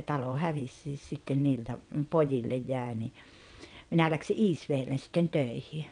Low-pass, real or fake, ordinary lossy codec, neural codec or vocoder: 9.9 kHz; fake; none; vocoder, 22.05 kHz, 80 mel bands, WaveNeXt